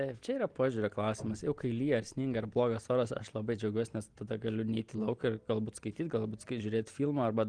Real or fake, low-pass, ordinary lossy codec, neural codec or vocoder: real; 9.9 kHz; Opus, 24 kbps; none